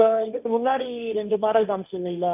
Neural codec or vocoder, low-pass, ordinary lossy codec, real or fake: codec, 16 kHz, 1.1 kbps, Voila-Tokenizer; 3.6 kHz; none; fake